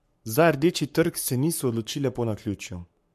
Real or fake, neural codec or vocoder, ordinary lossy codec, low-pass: fake; codec, 44.1 kHz, 7.8 kbps, Pupu-Codec; MP3, 64 kbps; 14.4 kHz